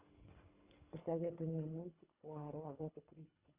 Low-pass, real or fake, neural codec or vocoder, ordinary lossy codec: 3.6 kHz; fake; codec, 24 kHz, 3 kbps, HILCodec; Opus, 64 kbps